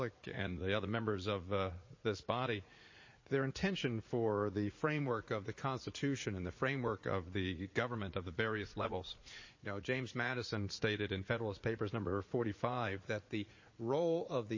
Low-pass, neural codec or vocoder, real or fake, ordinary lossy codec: 7.2 kHz; vocoder, 44.1 kHz, 80 mel bands, Vocos; fake; MP3, 32 kbps